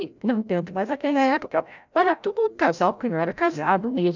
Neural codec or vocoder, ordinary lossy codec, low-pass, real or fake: codec, 16 kHz, 0.5 kbps, FreqCodec, larger model; none; 7.2 kHz; fake